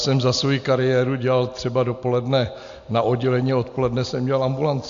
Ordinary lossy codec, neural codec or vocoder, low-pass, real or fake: AAC, 64 kbps; none; 7.2 kHz; real